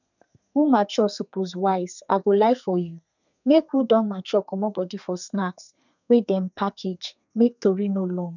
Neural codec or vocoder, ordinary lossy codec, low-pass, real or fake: codec, 32 kHz, 1.9 kbps, SNAC; none; 7.2 kHz; fake